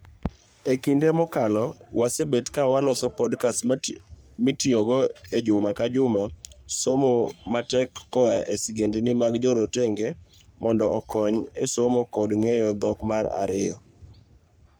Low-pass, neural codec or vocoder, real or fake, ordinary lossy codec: none; codec, 44.1 kHz, 3.4 kbps, Pupu-Codec; fake; none